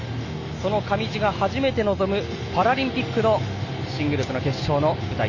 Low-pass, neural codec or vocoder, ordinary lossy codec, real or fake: 7.2 kHz; none; none; real